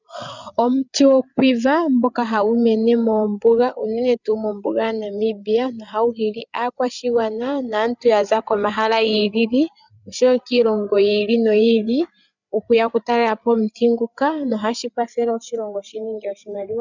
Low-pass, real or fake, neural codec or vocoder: 7.2 kHz; fake; codec, 16 kHz, 8 kbps, FreqCodec, larger model